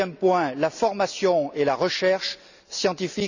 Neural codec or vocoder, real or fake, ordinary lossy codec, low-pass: none; real; none; 7.2 kHz